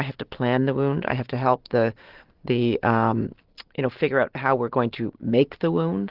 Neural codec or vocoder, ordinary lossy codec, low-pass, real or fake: none; Opus, 16 kbps; 5.4 kHz; real